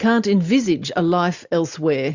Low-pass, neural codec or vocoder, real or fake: 7.2 kHz; none; real